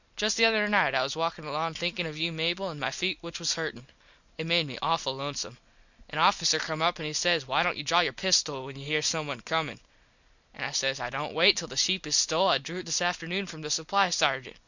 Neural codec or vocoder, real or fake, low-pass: none; real; 7.2 kHz